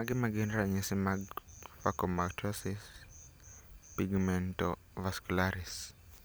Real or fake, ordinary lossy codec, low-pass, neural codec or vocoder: real; none; none; none